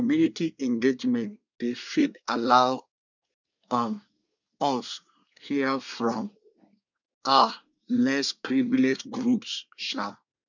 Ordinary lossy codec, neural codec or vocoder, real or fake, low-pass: none; codec, 24 kHz, 1 kbps, SNAC; fake; 7.2 kHz